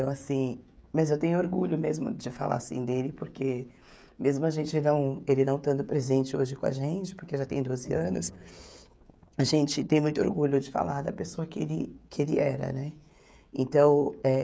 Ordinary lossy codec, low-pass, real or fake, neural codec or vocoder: none; none; fake; codec, 16 kHz, 16 kbps, FreqCodec, smaller model